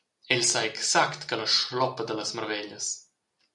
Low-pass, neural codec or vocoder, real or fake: 10.8 kHz; none; real